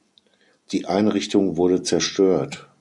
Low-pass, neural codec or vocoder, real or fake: 10.8 kHz; none; real